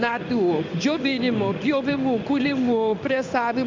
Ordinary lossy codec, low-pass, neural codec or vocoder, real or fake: MP3, 64 kbps; 7.2 kHz; codec, 16 kHz in and 24 kHz out, 1 kbps, XY-Tokenizer; fake